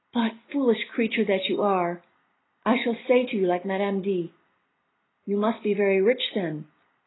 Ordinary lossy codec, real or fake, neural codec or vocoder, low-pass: AAC, 16 kbps; real; none; 7.2 kHz